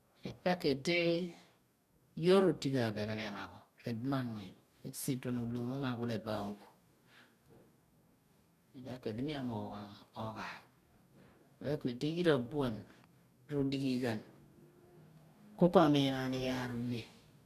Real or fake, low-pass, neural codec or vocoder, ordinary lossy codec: fake; 14.4 kHz; codec, 44.1 kHz, 2.6 kbps, DAC; none